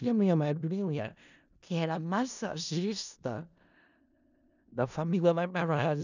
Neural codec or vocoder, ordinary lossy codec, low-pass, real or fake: codec, 16 kHz in and 24 kHz out, 0.4 kbps, LongCat-Audio-Codec, four codebook decoder; none; 7.2 kHz; fake